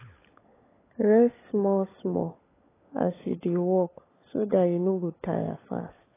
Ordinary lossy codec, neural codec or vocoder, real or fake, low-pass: AAC, 16 kbps; codec, 16 kHz, 16 kbps, FunCodec, trained on LibriTTS, 50 frames a second; fake; 3.6 kHz